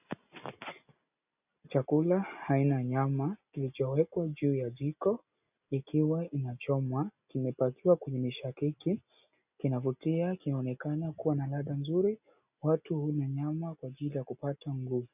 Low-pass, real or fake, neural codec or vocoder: 3.6 kHz; real; none